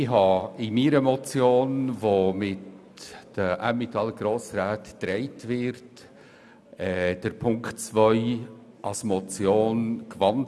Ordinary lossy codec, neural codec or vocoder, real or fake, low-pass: none; none; real; none